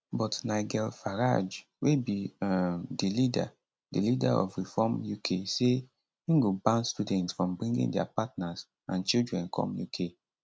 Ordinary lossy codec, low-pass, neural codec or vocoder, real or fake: none; none; none; real